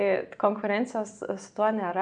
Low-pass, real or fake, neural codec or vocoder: 9.9 kHz; real; none